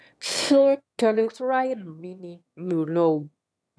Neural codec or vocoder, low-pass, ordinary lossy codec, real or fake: autoencoder, 22.05 kHz, a latent of 192 numbers a frame, VITS, trained on one speaker; none; none; fake